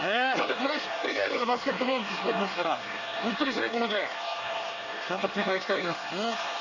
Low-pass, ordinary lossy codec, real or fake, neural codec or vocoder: 7.2 kHz; none; fake; codec, 24 kHz, 1 kbps, SNAC